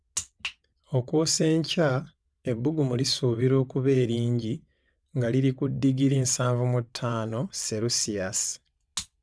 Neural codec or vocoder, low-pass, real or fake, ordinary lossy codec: vocoder, 22.05 kHz, 80 mel bands, WaveNeXt; none; fake; none